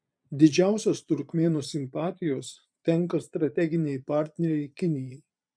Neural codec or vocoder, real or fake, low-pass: vocoder, 22.05 kHz, 80 mel bands, Vocos; fake; 9.9 kHz